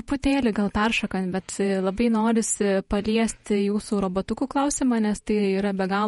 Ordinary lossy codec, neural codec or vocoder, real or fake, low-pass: MP3, 48 kbps; vocoder, 48 kHz, 128 mel bands, Vocos; fake; 19.8 kHz